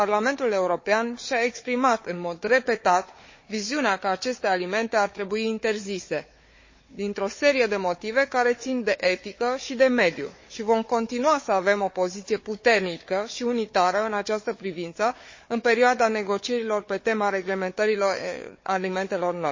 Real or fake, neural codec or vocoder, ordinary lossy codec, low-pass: fake; codec, 16 kHz, 4 kbps, FunCodec, trained on Chinese and English, 50 frames a second; MP3, 32 kbps; 7.2 kHz